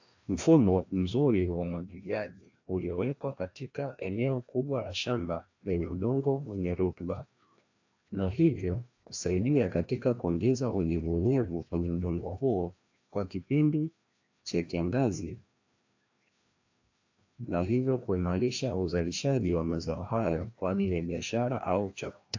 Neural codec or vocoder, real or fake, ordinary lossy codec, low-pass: codec, 16 kHz, 1 kbps, FreqCodec, larger model; fake; AAC, 48 kbps; 7.2 kHz